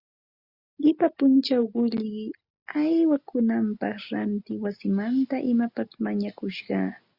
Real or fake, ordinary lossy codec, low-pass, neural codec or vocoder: real; Opus, 64 kbps; 5.4 kHz; none